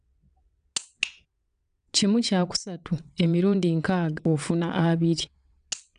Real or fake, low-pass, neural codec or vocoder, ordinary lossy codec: fake; 9.9 kHz; vocoder, 22.05 kHz, 80 mel bands, WaveNeXt; none